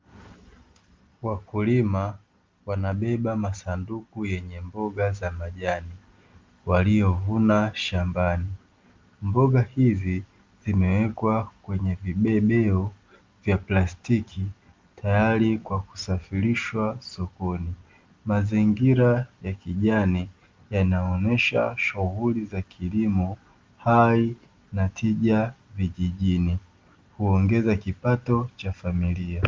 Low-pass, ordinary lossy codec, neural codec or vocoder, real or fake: 7.2 kHz; Opus, 32 kbps; none; real